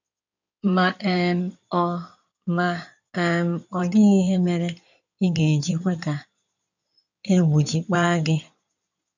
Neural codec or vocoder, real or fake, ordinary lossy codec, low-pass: codec, 16 kHz in and 24 kHz out, 2.2 kbps, FireRedTTS-2 codec; fake; none; 7.2 kHz